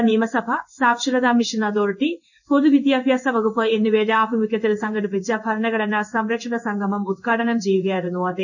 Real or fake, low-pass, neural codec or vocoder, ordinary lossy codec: fake; 7.2 kHz; codec, 16 kHz in and 24 kHz out, 1 kbps, XY-Tokenizer; none